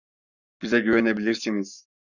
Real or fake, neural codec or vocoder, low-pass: fake; codec, 44.1 kHz, 7.8 kbps, Pupu-Codec; 7.2 kHz